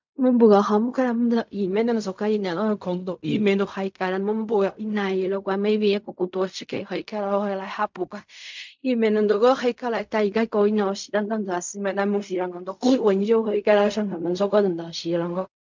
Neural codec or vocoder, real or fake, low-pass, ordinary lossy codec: codec, 16 kHz in and 24 kHz out, 0.4 kbps, LongCat-Audio-Codec, fine tuned four codebook decoder; fake; 7.2 kHz; MP3, 64 kbps